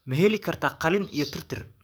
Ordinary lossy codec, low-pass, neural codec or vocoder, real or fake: none; none; codec, 44.1 kHz, 7.8 kbps, DAC; fake